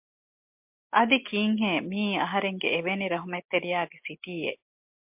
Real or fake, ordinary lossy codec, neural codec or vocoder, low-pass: real; MP3, 32 kbps; none; 3.6 kHz